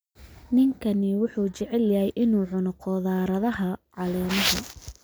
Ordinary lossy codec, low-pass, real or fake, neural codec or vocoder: none; none; real; none